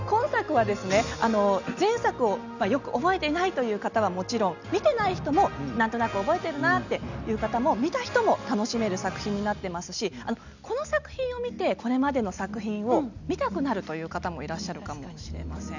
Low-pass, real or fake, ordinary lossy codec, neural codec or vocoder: 7.2 kHz; real; none; none